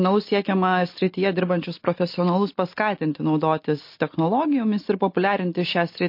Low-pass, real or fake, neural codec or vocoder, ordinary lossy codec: 5.4 kHz; real; none; MP3, 32 kbps